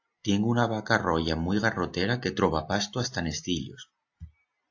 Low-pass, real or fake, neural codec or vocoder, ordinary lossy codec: 7.2 kHz; real; none; AAC, 48 kbps